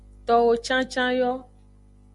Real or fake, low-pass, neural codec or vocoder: real; 10.8 kHz; none